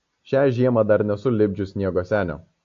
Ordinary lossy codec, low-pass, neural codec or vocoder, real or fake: AAC, 48 kbps; 7.2 kHz; none; real